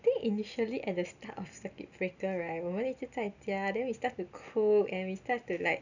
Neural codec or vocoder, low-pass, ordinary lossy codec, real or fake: none; 7.2 kHz; none; real